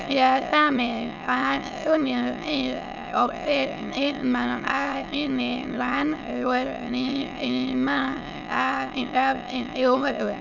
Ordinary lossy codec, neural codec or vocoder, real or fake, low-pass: none; autoencoder, 22.05 kHz, a latent of 192 numbers a frame, VITS, trained on many speakers; fake; 7.2 kHz